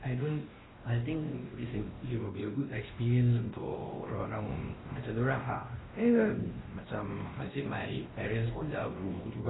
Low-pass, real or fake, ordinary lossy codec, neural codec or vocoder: 7.2 kHz; fake; AAC, 16 kbps; codec, 16 kHz, 1 kbps, X-Codec, WavLM features, trained on Multilingual LibriSpeech